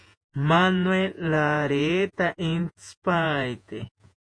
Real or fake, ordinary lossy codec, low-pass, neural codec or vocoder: fake; MP3, 48 kbps; 9.9 kHz; vocoder, 48 kHz, 128 mel bands, Vocos